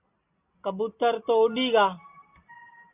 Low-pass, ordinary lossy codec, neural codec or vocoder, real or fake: 3.6 kHz; AAC, 32 kbps; none; real